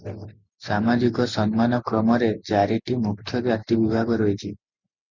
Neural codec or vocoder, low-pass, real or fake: none; 7.2 kHz; real